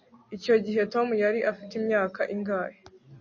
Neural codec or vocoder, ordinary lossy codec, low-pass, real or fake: none; MP3, 32 kbps; 7.2 kHz; real